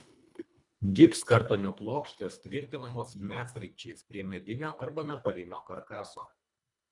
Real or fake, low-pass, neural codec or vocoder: fake; 10.8 kHz; codec, 24 kHz, 1.5 kbps, HILCodec